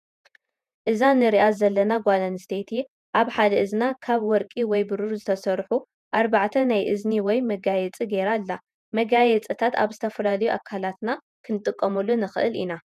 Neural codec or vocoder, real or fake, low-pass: vocoder, 48 kHz, 128 mel bands, Vocos; fake; 14.4 kHz